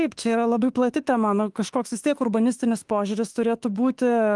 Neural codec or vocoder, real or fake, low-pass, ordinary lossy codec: autoencoder, 48 kHz, 32 numbers a frame, DAC-VAE, trained on Japanese speech; fake; 10.8 kHz; Opus, 24 kbps